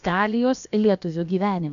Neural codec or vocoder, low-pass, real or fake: codec, 16 kHz, about 1 kbps, DyCAST, with the encoder's durations; 7.2 kHz; fake